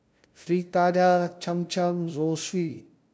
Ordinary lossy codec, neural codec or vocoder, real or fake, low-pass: none; codec, 16 kHz, 0.5 kbps, FunCodec, trained on LibriTTS, 25 frames a second; fake; none